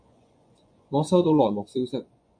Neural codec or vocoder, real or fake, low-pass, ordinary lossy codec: vocoder, 24 kHz, 100 mel bands, Vocos; fake; 9.9 kHz; AAC, 64 kbps